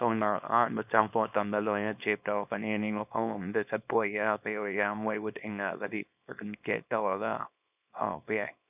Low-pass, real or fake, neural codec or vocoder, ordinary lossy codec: 3.6 kHz; fake; codec, 24 kHz, 0.9 kbps, WavTokenizer, small release; none